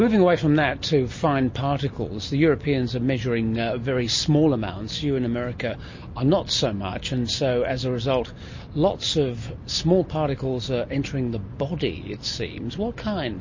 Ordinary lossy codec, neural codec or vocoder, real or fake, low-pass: MP3, 32 kbps; none; real; 7.2 kHz